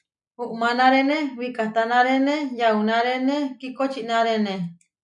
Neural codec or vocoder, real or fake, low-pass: none; real; 10.8 kHz